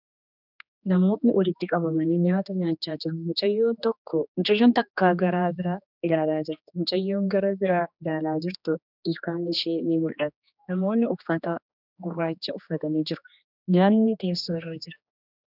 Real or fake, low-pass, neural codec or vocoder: fake; 5.4 kHz; codec, 16 kHz, 2 kbps, X-Codec, HuBERT features, trained on general audio